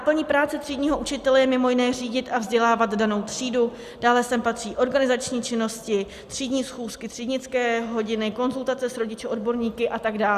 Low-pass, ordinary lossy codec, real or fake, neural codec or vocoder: 14.4 kHz; Opus, 64 kbps; real; none